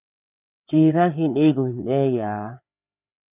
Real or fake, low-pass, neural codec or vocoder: fake; 3.6 kHz; codec, 16 kHz, 4 kbps, FreqCodec, larger model